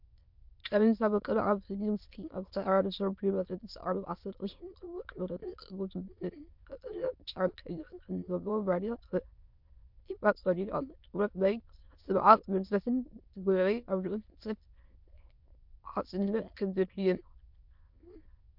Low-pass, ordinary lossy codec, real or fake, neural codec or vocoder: 5.4 kHz; MP3, 48 kbps; fake; autoencoder, 22.05 kHz, a latent of 192 numbers a frame, VITS, trained on many speakers